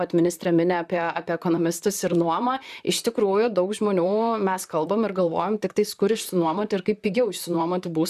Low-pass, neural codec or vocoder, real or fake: 14.4 kHz; vocoder, 44.1 kHz, 128 mel bands, Pupu-Vocoder; fake